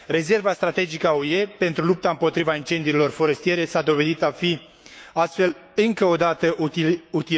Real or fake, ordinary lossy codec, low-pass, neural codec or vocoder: fake; none; none; codec, 16 kHz, 6 kbps, DAC